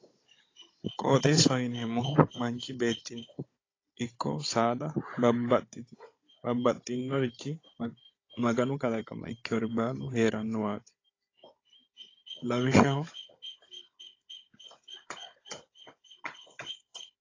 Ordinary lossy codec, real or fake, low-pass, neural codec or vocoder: AAC, 32 kbps; fake; 7.2 kHz; codec, 16 kHz, 16 kbps, FunCodec, trained on Chinese and English, 50 frames a second